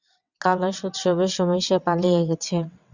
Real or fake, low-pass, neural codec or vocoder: fake; 7.2 kHz; vocoder, 22.05 kHz, 80 mel bands, WaveNeXt